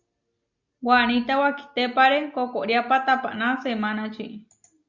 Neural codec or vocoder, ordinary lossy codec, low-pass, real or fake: none; Opus, 64 kbps; 7.2 kHz; real